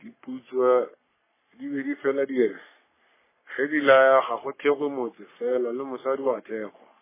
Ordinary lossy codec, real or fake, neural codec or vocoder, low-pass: MP3, 16 kbps; fake; codec, 44.1 kHz, 3.4 kbps, Pupu-Codec; 3.6 kHz